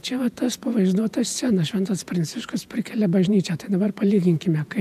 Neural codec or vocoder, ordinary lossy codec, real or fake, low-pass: vocoder, 48 kHz, 128 mel bands, Vocos; AAC, 96 kbps; fake; 14.4 kHz